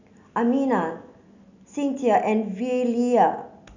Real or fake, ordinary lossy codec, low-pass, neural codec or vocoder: real; none; 7.2 kHz; none